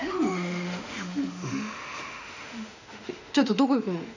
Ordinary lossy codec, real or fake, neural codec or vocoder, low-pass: none; fake; autoencoder, 48 kHz, 32 numbers a frame, DAC-VAE, trained on Japanese speech; 7.2 kHz